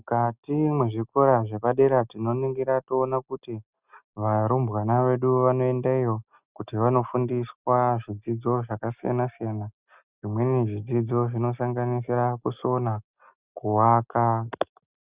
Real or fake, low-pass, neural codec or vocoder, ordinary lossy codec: real; 3.6 kHz; none; Opus, 64 kbps